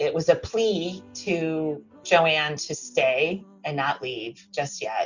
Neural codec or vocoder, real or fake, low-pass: none; real; 7.2 kHz